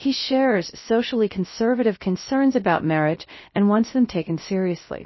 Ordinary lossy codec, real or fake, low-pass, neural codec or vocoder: MP3, 24 kbps; fake; 7.2 kHz; codec, 16 kHz, 0.3 kbps, FocalCodec